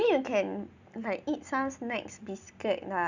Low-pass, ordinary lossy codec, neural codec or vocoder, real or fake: 7.2 kHz; none; none; real